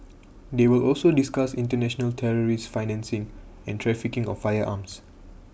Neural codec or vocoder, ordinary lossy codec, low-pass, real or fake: none; none; none; real